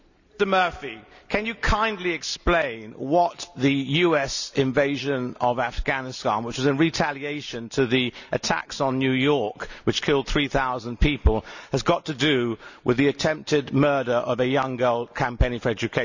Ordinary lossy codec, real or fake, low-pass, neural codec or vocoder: none; real; 7.2 kHz; none